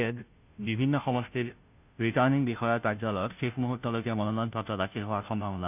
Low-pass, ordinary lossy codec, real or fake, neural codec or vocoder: 3.6 kHz; none; fake; codec, 16 kHz, 0.5 kbps, FunCodec, trained on Chinese and English, 25 frames a second